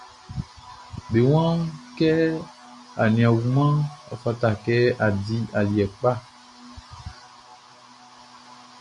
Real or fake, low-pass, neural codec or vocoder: real; 10.8 kHz; none